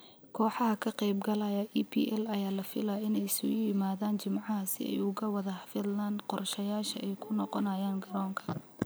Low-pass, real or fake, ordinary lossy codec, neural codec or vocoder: none; real; none; none